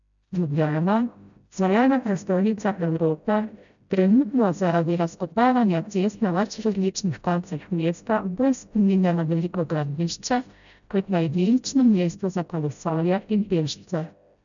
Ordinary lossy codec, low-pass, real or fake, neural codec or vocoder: none; 7.2 kHz; fake; codec, 16 kHz, 0.5 kbps, FreqCodec, smaller model